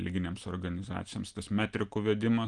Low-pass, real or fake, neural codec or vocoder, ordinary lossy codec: 9.9 kHz; real; none; Opus, 24 kbps